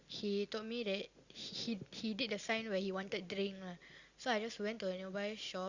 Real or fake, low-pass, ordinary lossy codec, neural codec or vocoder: real; 7.2 kHz; Opus, 64 kbps; none